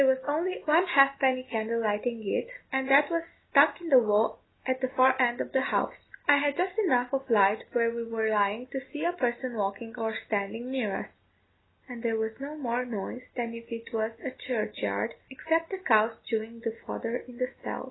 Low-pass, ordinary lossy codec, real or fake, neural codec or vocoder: 7.2 kHz; AAC, 16 kbps; real; none